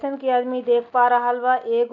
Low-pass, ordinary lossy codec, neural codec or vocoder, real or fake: 7.2 kHz; none; none; real